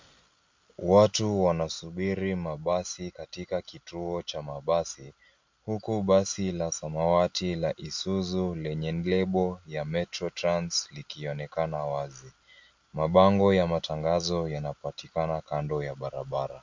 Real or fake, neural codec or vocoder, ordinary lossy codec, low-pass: real; none; MP3, 48 kbps; 7.2 kHz